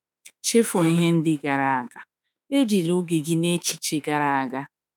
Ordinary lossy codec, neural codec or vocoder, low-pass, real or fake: none; autoencoder, 48 kHz, 32 numbers a frame, DAC-VAE, trained on Japanese speech; 19.8 kHz; fake